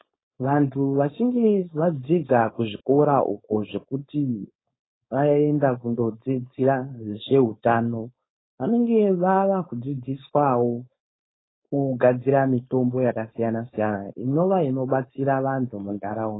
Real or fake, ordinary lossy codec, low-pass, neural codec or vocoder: fake; AAC, 16 kbps; 7.2 kHz; codec, 16 kHz, 4.8 kbps, FACodec